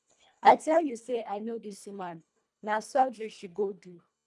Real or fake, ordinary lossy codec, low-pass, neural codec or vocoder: fake; none; none; codec, 24 kHz, 1.5 kbps, HILCodec